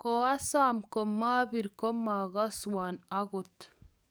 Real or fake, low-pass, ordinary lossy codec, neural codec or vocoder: fake; none; none; codec, 44.1 kHz, 7.8 kbps, DAC